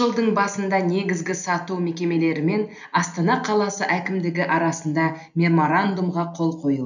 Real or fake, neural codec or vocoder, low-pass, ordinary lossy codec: real; none; 7.2 kHz; none